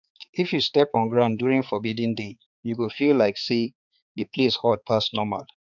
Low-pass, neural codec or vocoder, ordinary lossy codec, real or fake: 7.2 kHz; codec, 16 kHz, 4 kbps, X-Codec, HuBERT features, trained on balanced general audio; none; fake